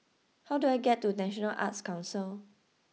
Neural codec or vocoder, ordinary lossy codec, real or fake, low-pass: none; none; real; none